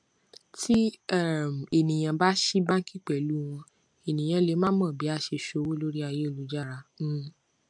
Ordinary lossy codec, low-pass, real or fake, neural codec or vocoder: MP3, 64 kbps; 9.9 kHz; real; none